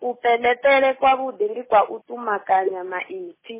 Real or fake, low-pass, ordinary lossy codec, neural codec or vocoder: real; 3.6 kHz; MP3, 16 kbps; none